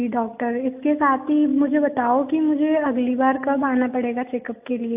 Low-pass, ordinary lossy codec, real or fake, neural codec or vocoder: 3.6 kHz; AAC, 32 kbps; real; none